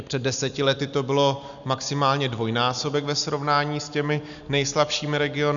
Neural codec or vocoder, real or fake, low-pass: none; real; 7.2 kHz